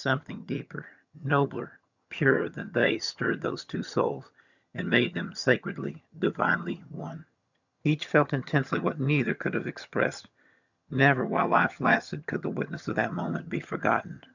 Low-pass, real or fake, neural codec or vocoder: 7.2 kHz; fake; vocoder, 22.05 kHz, 80 mel bands, HiFi-GAN